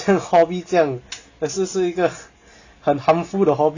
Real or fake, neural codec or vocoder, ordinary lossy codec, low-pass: real; none; Opus, 64 kbps; 7.2 kHz